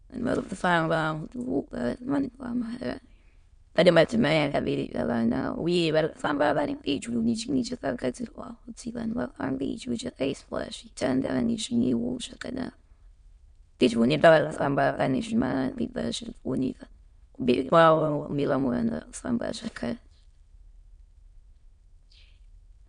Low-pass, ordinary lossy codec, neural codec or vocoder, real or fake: 9.9 kHz; MP3, 64 kbps; autoencoder, 22.05 kHz, a latent of 192 numbers a frame, VITS, trained on many speakers; fake